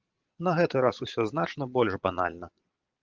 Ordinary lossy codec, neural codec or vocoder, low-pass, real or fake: Opus, 32 kbps; vocoder, 22.05 kHz, 80 mel bands, Vocos; 7.2 kHz; fake